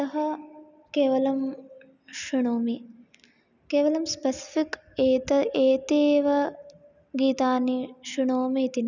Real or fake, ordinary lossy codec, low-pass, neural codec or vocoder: real; none; 7.2 kHz; none